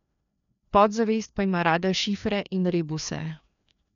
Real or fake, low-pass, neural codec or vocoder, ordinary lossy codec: fake; 7.2 kHz; codec, 16 kHz, 2 kbps, FreqCodec, larger model; none